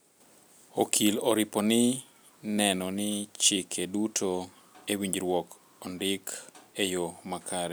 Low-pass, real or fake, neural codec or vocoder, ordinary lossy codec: none; real; none; none